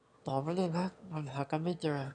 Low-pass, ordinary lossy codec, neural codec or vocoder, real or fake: 9.9 kHz; MP3, 96 kbps; autoencoder, 22.05 kHz, a latent of 192 numbers a frame, VITS, trained on one speaker; fake